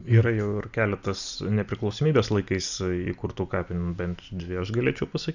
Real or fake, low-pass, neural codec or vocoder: real; 7.2 kHz; none